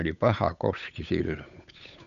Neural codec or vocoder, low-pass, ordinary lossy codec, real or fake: codec, 16 kHz, 8 kbps, FunCodec, trained on Chinese and English, 25 frames a second; 7.2 kHz; none; fake